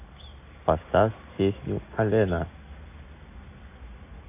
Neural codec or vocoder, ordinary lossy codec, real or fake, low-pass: none; AAC, 24 kbps; real; 3.6 kHz